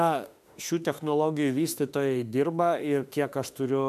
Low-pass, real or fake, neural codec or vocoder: 14.4 kHz; fake; autoencoder, 48 kHz, 32 numbers a frame, DAC-VAE, trained on Japanese speech